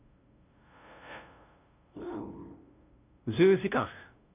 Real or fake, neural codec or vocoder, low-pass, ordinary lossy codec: fake; codec, 16 kHz, 0.5 kbps, FunCodec, trained on LibriTTS, 25 frames a second; 3.6 kHz; none